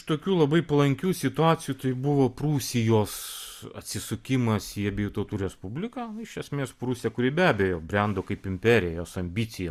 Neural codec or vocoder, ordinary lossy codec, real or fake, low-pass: none; Opus, 64 kbps; real; 14.4 kHz